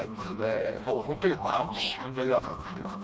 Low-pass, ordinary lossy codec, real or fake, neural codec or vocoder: none; none; fake; codec, 16 kHz, 1 kbps, FreqCodec, smaller model